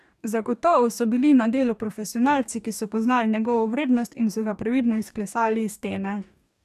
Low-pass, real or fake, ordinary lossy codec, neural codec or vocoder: 14.4 kHz; fake; none; codec, 44.1 kHz, 2.6 kbps, DAC